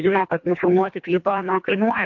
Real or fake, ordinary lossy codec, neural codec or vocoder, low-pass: fake; MP3, 48 kbps; codec, 24 kHz, 1.5 kbps, HILCodec; 7.2 kHz